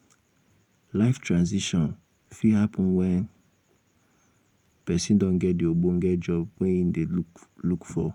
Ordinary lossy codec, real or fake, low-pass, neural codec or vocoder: none; fake; 19.8 kHz; vocoder, 44.1 kHz, 128 mel bands every 512 samples, BigVGAN v2